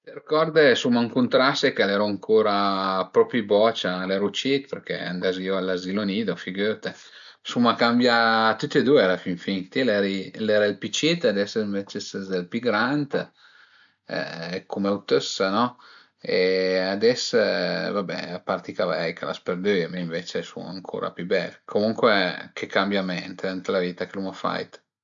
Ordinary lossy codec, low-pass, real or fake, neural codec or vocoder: MP3, 64 kbps; 7.2 kHz; real; none